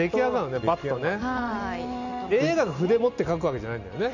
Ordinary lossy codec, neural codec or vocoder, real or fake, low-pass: none; none; real; 7.2 kHz